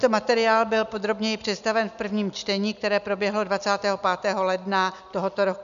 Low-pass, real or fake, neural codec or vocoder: 7.2 kHz; real; none